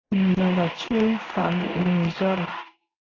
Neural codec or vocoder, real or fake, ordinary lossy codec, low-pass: codec, 16 kHz in and 24 kHz out, 1 kbps, XY-Tokenizer; fake; AAC, 32 kbps; 7.2 kHz